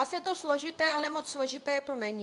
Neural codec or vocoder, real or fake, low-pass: codec, 24 kHz, 0.9 kbps, WavTokenizer, medium speech release version 2; fake; 10.8 kHz